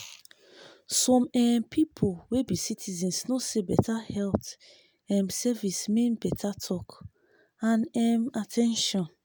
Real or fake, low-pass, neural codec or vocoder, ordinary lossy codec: real; none; none; none